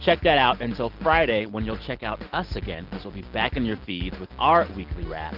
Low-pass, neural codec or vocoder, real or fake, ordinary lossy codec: 5.4 kHz; none; real; Opus, 16 kbps